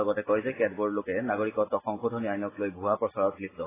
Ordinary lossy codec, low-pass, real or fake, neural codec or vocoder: AAC, 16 kbps; 3.6 kHz; real; none